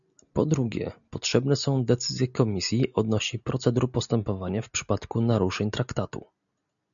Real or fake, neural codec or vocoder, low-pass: real; none; 7.2 kHz